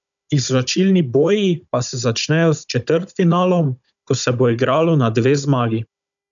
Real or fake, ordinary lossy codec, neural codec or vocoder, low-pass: fake; none; codec, 16 kHz, 16 kbps, FunCodec, trained on Chinese and English, 50 frames a second; 7.2 kHz